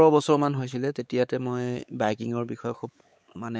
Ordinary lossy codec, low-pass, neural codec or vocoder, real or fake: none; none; codec, 16 kHz, 4 kbps, X-Codec, WavLM features, trained on Multilingual LibriSpeech; fake